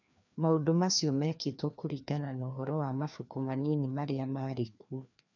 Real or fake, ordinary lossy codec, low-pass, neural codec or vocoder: fake; none; 7.2 kHz; codec, 16 kHz, 2 kbps, FreqCodec, larger model